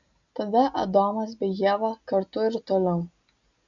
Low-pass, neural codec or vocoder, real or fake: 7.2 kHz; none; real